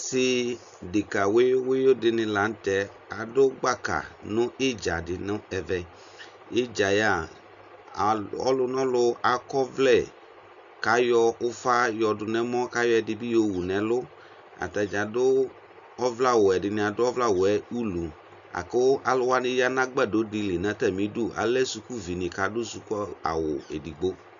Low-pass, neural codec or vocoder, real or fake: 7.2 kHz; none; real